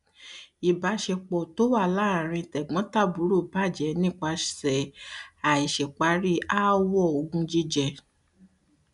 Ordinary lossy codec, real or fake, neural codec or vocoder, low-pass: none; real; none; 10.8 kHz